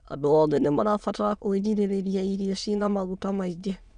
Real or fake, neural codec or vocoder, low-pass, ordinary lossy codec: fake; autoencoder, 22.05 kHz, a latent of 192 numbers a frame, VITS, trained on many speakers; 9.9 kHz; none